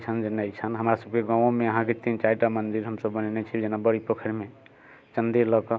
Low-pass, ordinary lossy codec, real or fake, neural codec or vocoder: none; none; real; none